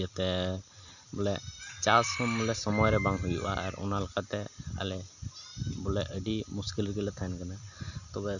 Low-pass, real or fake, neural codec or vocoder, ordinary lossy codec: 7.2 kHz; real; none; none